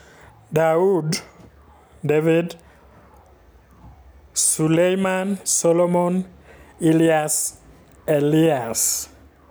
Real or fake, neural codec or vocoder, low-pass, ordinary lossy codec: real; none; none; none